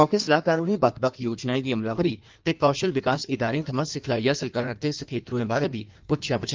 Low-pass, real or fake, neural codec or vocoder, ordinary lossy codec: 7.2 kHz; fake; codec, 16 kHz in and 24 kHz out, 1.1 kbps, FireRedTTS-2 codec; Opus, 24 kbps